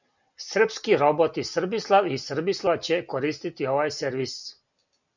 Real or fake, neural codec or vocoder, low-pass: real; none; 7.2 kHz